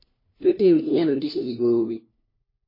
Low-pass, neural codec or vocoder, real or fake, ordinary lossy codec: 5.4 kHz; codec, 16 kHz, 1 kbps, FunCodec, trained on LibriTTS, 50 frames a second; fake; MP3, 24 kbps